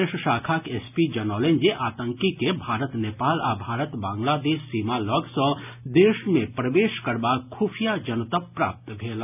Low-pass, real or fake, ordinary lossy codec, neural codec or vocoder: 3.6 kHz; real; none; none